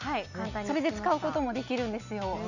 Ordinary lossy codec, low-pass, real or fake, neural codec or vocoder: none; 7.2 kHz; real; none